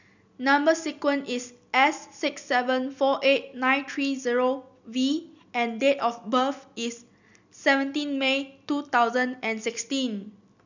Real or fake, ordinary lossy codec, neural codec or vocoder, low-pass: real; none; none; 7.2 kHz